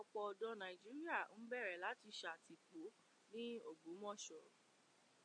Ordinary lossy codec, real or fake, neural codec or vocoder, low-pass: MP3, 48 kbps; real; none; 9.9 kHz